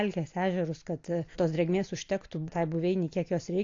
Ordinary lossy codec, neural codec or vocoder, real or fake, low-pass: AAC, 48 kbps; none; real; 7.2 kHz